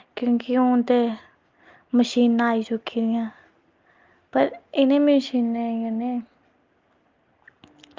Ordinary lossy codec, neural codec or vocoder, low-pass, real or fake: Opus, 32 kbps; none; 7.2 kHz; real